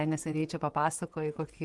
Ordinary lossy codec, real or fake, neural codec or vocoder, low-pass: Opus, 24 kbps; fake; vocoder, 24 kHz, 100 mel bands, Vocos; 10.8 kHz